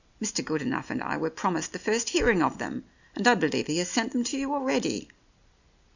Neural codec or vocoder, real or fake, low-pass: none; real; 7.2 kHz